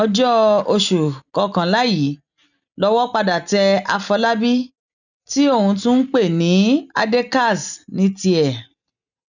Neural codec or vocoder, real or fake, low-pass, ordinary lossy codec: none; real; 7.2 kHz; none